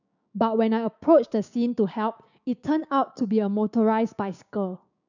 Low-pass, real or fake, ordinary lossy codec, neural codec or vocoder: 7.2 kHz; fake; none; codec, 16 kHz, 6 kbps, DAC